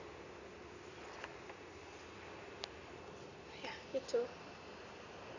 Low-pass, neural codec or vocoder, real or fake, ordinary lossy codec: 7.2 kHz; none; real; none